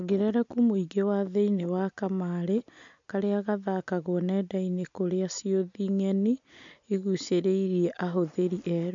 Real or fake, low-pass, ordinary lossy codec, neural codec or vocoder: real; 7.2 kHz; MP3, 64 kbps; none